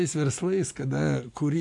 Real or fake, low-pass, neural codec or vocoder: real; 10.8 kHz; none